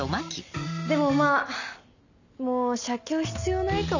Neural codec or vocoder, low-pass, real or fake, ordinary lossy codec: none; 7.2 kHz; real; none